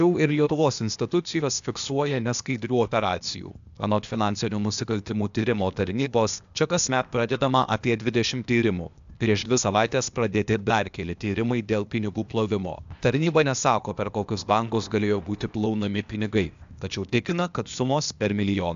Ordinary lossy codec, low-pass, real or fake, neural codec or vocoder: AAC, 96 kbps; 7.2 kHz; fake; codec, 16 kHz, 0.8 kbps, ZipCodec